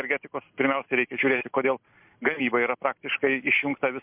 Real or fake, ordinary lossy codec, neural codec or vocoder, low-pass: real; MP3, 32 kbps; none; 3.6 kHz